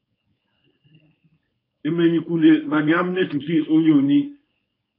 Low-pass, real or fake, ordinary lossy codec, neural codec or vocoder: 5.4 kHz; fake; AAC, 24 kbps; codec, 16 kHz, 4.8 kbps, FACodec